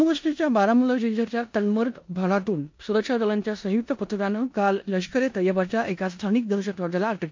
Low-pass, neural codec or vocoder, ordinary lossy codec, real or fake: 7.2 kHz; codec, 16 kHz in and 24 kHz out, 0.9 kbps, LongCat-Audio-Codec, four codebook decoder; MP3, 48 kbps; fake